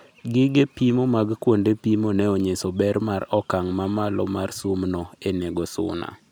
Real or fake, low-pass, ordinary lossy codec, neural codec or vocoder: real; none; none; none